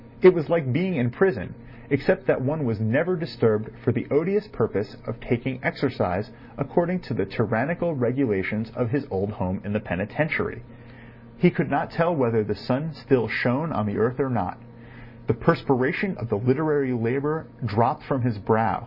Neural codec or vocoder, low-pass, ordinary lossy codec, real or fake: none; 5.4 kHz; Opus, 64 kbps; real